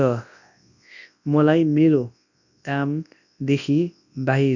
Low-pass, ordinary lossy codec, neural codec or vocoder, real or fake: 7.2 kHz; none; codec, 24 kHz, 0.9 kbps, WavTokenizer, large speech release; fake